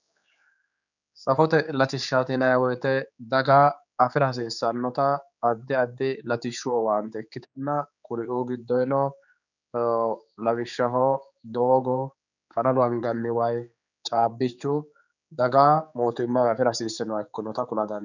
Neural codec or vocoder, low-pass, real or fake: codec, 16 kHz, 4 kbps, X-Codec, HuBERT features, trained on general audio; 7.2 kHz; fake